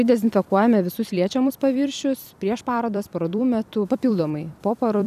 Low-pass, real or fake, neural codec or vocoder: 14.4 kHz; fake; vocoder, 44.1 kHz, 128 mel bands every 256 samples, BigVGAN v2